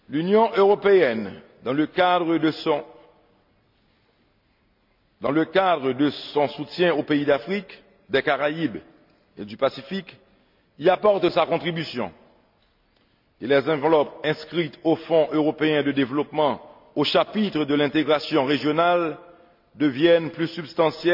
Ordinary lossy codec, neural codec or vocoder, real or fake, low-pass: MP3, 48 kbps; none; real; 5.4 kHz